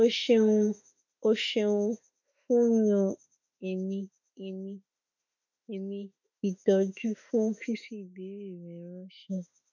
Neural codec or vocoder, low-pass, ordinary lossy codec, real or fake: autoencoder, 48 kHz, 32 numbers a frame, DAC-VAE, trained on Japanese speech; 7.2 kHz; none; fake